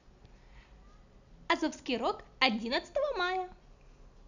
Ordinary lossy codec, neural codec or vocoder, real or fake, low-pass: none; none; real; 7.2 kHz